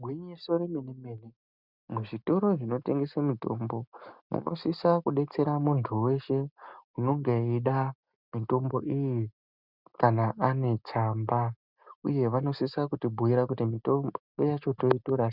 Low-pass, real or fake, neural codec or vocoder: 5.4 kHz; real; none